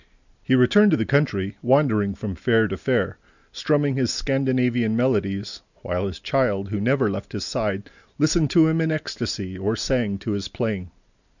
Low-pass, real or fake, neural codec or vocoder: 7.2 kHz; real; none